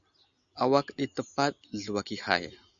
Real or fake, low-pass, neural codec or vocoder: real; 7.2 kHz; none